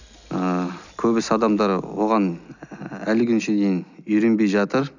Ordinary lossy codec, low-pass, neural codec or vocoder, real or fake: none; 7.2 kHz; none; real